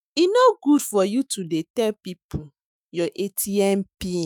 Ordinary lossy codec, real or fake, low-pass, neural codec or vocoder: none; fake; none; autoencoder, 48 kHz, 128 numbers a frame, DAC-VAE, trained on Japanese speech